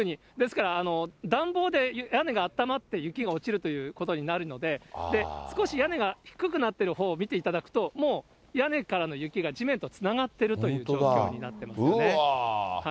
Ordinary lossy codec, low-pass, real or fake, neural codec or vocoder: none; none; real; none